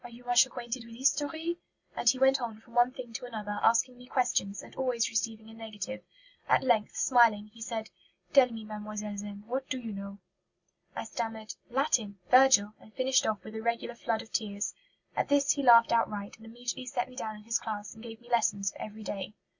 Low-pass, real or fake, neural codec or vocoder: 7.2 kHz; real; none